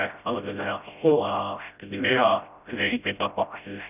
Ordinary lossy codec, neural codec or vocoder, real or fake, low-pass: none; codec, 16 kHz, 0.5 kbps, FreqCodec, smaller model; fake; 3.6 kHz